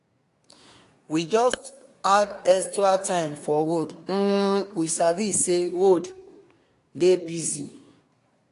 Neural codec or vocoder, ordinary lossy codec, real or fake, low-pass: codec, 24 kHz, 1 kbps, SNAC; AAC, 48 kbps; fake; 10.8 kHz